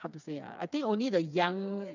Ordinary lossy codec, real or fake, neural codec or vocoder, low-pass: none; fake; codec, 16 kHz, 4 kbps, FreqCodec, smaller model; 7.2 kHz